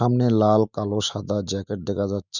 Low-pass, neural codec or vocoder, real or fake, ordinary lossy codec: 7.2 kHz; autoencoder, 48 kHz, 128 numbers a frame, DAC-VAE, trained on Japanese speech; fake; none